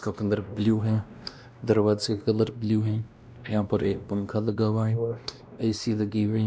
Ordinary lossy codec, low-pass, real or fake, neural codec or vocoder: none; none; fake; codec, 16 kHz, 1 kbps, X-Codec, WavLM features, trained on Multilingual LibriSpeech